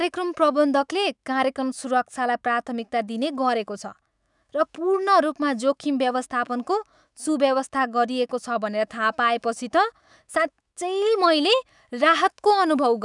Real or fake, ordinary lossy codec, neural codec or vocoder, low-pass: fake; none; autoencoder, 48 kHz, 128 numbers a frame, DAC-VAE, trained on Japanese speech; 10.8 kHz